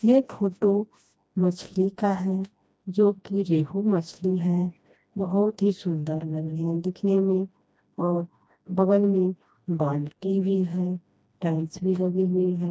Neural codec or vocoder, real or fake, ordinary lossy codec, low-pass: codec, 16 kHz, 1 kbps, FreqCodec, smaller model; fake; none; none